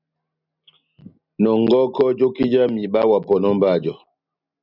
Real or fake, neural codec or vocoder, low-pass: real; none; 5.4 kHz